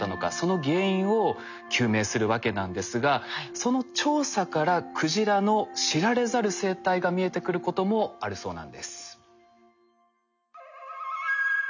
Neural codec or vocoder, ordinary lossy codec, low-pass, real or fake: none; none; 7.2 kHz; real